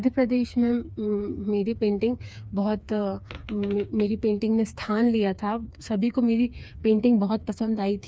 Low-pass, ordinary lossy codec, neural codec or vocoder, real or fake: none; none; codec, 16 kHz, 4 kbps, FreqCodec, smaller model; fake